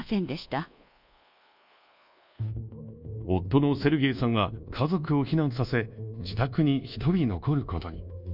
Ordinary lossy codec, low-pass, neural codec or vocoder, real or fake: none; 5.4 kHz; codec, 24 kHz, 1.2 kbps, DualCodec; fake